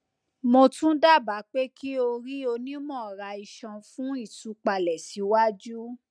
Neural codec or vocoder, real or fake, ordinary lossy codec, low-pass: none; real; none; 9.9 kHz